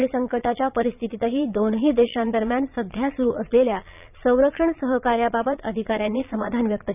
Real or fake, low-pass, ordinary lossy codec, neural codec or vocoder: fake; 3.6 kHz; none; vocoder, 22.05 kHz, 80 mel bands, Vocos